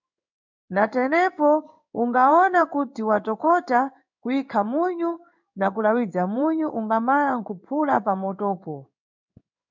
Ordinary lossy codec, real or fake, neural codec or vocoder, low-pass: MP3, 64 kbps; fake; codec, 16 kHz in and 24 kHz out, 1 kbps, XY-Tokenizer; 7.2 kHz